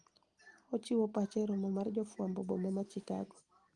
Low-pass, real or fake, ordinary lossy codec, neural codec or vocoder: 9.9 kHz; real; Opus, 24 kbps; none